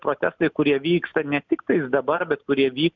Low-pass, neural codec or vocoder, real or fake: 7.2 kHz; none; real